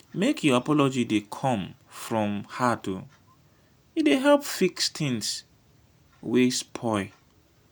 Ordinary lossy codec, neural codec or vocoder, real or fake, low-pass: none; none; real; none